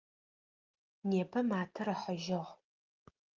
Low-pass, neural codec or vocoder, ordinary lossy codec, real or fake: 7.2 kHz; none; Opus, 24 kbps; real